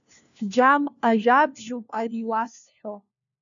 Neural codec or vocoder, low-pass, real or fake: codec, 16 kHz, 1 kbps, FunCodec, trained on LibriTTS, 50 frames a second; 7.2 kHz; fake